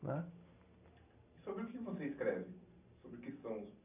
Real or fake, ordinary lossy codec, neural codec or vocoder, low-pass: real; none; none; 3.6 kHz